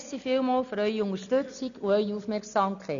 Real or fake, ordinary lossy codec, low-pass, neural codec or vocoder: real; none; 7.2 kHz; none